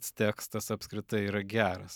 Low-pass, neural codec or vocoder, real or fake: 19.8 kHz; none; real